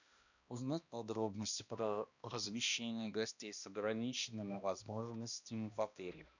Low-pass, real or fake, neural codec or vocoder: 7.2 kHz; fake; codec, 16 kHz, 1 kbps, X-Codec, HuBERT features, trained on balanced general audio